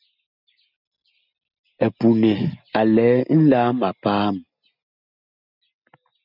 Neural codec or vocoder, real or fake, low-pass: none; real; 5.4 kHz